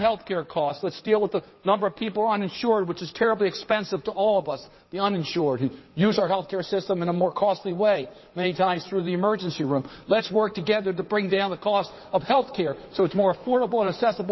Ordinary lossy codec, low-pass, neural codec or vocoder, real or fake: MP3, 24 kbps; 7.2 kHz; codec, 16 kHz in and 24 kHz out, 2.2 kbps, FireRedTTS-2 codec; fake